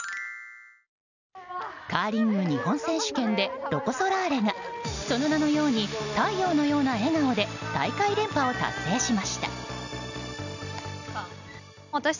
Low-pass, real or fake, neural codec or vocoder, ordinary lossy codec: 7.2 kHz; real; none; none